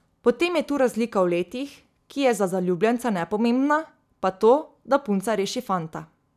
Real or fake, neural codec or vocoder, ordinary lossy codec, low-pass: real; none; none; 14.4 kHz